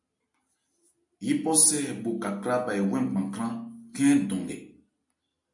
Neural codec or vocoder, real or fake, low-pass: none; real; 10.8 kHz